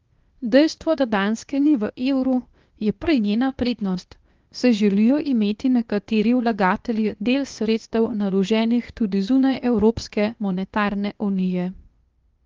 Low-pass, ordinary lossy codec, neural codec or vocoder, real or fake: 7.2 kHz; Opus, 32 kbps; codec, 16 kHz, 0.8 kbps, ZipCodec; fake